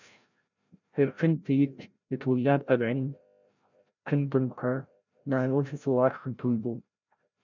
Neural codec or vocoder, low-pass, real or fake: codec, 16 kHz, 0.5 kbps, FreqCodec, larger model; 7.2 kHz; fake